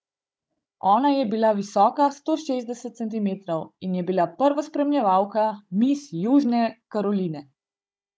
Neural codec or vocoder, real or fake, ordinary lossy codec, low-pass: codec, 16 kHz, 16 kbps, FunCodec, trained on Chinese and English, 50 frames a second; fake; none; none